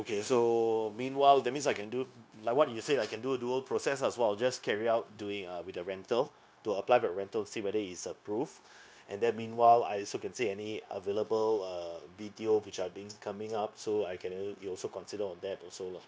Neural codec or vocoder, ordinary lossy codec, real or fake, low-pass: codec, 16 kHz, 0.9 kbps, LongCat-Audio-Codec; none; fake; none